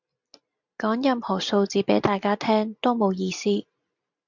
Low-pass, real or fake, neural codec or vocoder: 7.2 kHz; real; none